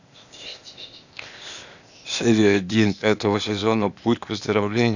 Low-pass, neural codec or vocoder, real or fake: 7.2 kHz; codec, 16 kHz, 0.8 kbps, ZipCodec; fake